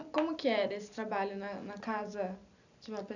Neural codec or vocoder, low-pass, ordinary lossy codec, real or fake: none; 7.2 kHz; none; real